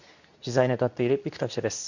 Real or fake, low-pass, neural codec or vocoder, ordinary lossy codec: fake; 7.2 kHz; codec, 24 kHz, 0.9 kbps, WavTokenizer, medium speech release version 2; none